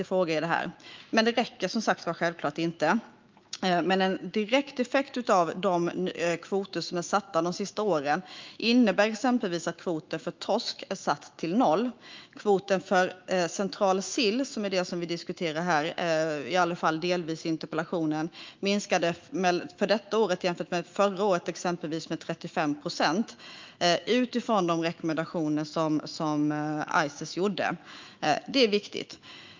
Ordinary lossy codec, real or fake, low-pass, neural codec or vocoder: Opus, 32 kbps; fake; 7.2 kHz; autoencoder, 48 kHz, 128 numbers a frame, DAC-VAE, trained on Japanese speech